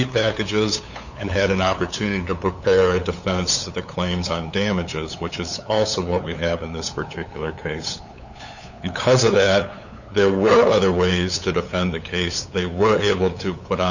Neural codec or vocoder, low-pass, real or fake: codec, 16 kHz, 8 kbps, FunCodec, trained on LibriTTS, 25 frames a second; 7.2 kHz; fake